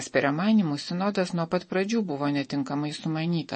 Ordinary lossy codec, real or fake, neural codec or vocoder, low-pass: MP3, 32 kbps; fake; vocoder, 24 kHz, 100 mel bands, Vocos; 10.8 kHz